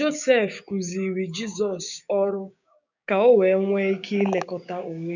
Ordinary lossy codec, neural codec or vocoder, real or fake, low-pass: none; codec, 16 kHz, 6 kbps, DAC; fake; 7.2 kHz